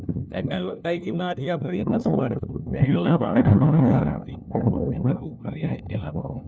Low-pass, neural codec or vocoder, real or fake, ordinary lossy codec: none; codec, 16 kHz, 1 kbps, FunCodec, trained on LibriTTS, 50 frames a second; fake; none